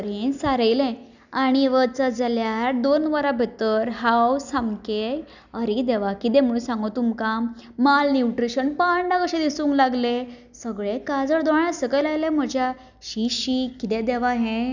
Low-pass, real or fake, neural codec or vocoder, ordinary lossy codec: 7.2 kHz; real; none; none